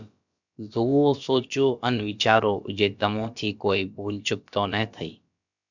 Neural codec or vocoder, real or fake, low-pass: codec, 16 kHz, about 1 kbps, DyCAST, with the encoder's durations; fake; 7.2 kHz